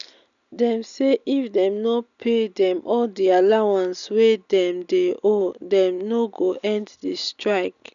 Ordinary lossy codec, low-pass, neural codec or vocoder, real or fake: none; 7.2 kHz; none; real